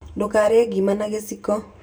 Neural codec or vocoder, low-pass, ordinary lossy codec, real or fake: vocoder, 44.1 kHz, 128 mel bands every 512 samples, BigVGAN v2; none; none; fake